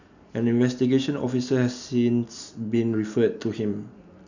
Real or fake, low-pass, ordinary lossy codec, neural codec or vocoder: real; 7.2 kHz; none; none